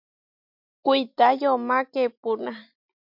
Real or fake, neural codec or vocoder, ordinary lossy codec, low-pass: real; none; MP3, 48 kbps; 5.4 kHz